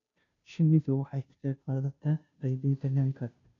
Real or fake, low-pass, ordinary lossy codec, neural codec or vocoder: fake; 7.2 kHz; AAC, 64 kbps; codec, 16 kHz, 0.5 kbps, FunCodec, trained on Chinese and English, 25 frames a second